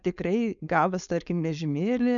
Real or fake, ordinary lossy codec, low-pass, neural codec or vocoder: fake; MP3, 96 kbps; 7.2 kHz; codec, 16 kHz, 4.8 kbps, FACodec